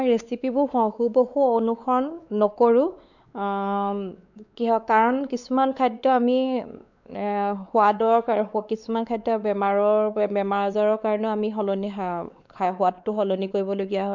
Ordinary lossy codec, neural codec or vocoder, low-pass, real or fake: none; codec, 16 kHz, 4 kbps, X-Codec, WavLM features, trained on Multilingual LibriSpeech; 7.2 kHz; fake